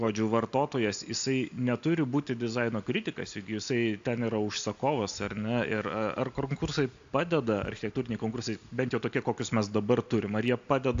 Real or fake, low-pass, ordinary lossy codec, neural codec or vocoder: real; 7.2 kHz; AAC, 64 kbps; none